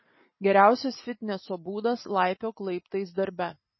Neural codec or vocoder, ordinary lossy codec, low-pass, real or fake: none; MP3, 24 kbps; 7.2 kHz; real